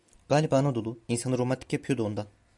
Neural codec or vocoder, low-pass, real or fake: none; 10.8 kHz; real